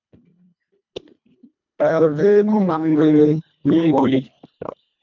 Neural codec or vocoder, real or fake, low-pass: codec, 24 kHz, 1.5 kbps, HILCodec; fake; 7.2 kHz